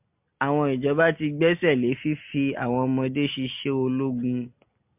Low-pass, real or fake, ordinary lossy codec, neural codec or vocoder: 3.6 kHz; real; MP3, 32 kbps; none